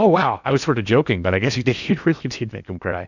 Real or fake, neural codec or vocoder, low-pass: fake; codec, 16 kHz in and 24 kHz out, 0.8 kbps, FocalCodec, streaming, 65536 codes; 7.2 kHz